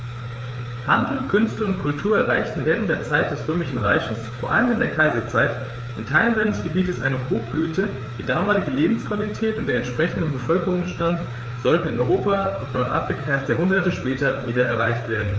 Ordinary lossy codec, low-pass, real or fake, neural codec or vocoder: none; none; fake; codec, 16 kHz, 4 kbps, FreqCodec, larger model